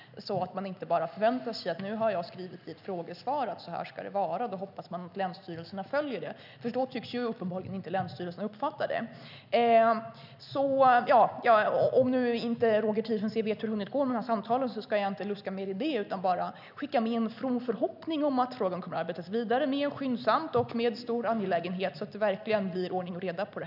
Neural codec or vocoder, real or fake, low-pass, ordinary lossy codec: none; real; 5.4 kHz; none